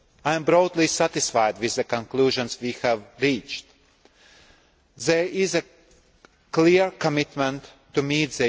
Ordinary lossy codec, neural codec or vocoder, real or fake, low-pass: none; none; real; none